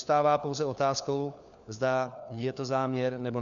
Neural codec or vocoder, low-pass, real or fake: codec, 16 kHz, 4 kbps, FunCodec, trained on LibriTTS, 50 frames a second; 7.2 kHz; fake